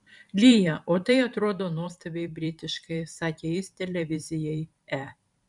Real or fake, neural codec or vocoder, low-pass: fake; vocoder, 44.1 kHz, 128 mel bands every 512 samples, BigVGAN v2; 10.8 kHz